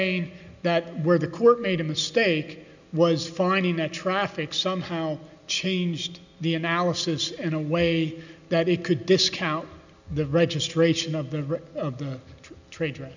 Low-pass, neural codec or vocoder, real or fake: 7.2 kHz; none; real